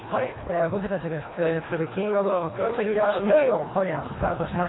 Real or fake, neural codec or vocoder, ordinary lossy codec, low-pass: fake; codec, 24 kHz, 1.5 kbps, HILCodec; AAC, 16 kbps; 7.2 kHz